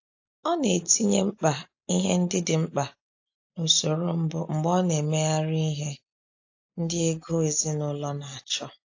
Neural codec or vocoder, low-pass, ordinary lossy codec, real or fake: none; 7.2 kHz; AAC, 48 kbps; real